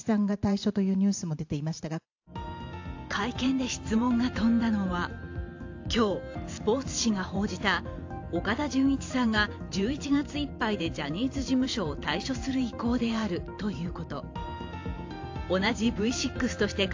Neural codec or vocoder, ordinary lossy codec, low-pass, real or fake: vocoder, 44.1 kHz, 128 mel bands every 512 samples, BigVGAN v2; AAC, 48 kbps; 7.2 kHz; fake